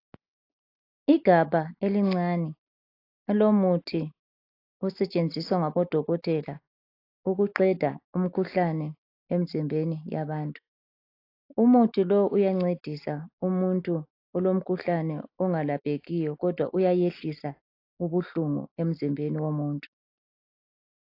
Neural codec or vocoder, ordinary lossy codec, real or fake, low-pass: none; AAC, 32 kbps; real; 5.4 kHz